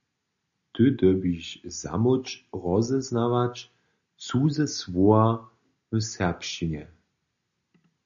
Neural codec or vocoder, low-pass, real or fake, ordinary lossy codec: none; 7.2 kHz; real; MP3, 96 kbps